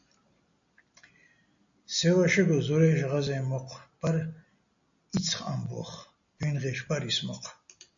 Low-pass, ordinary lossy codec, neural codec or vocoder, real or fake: 7.2 kHz; AAC, 64 kbps; none; real